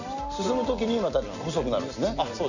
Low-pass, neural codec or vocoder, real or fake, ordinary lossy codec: 7.2 kHz; none; real; none